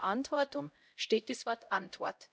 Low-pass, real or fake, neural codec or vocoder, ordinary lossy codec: none; fake; codec, 16 kHz, 0.5 kbps, X-Codec, HuBERT features, trained on LibriSpeech; none